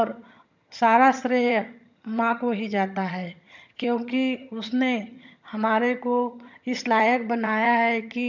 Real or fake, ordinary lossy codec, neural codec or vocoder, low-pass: fake; none; vocoder, 22.05 kHz, 80 mel bands, HiFi-GAN; 7.2 kHz